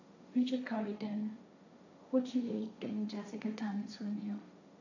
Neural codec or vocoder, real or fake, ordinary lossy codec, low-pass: codec, 16 kHz, 1.1 kbps, Voila-Tokenizer; fake; none; 7.2 kHz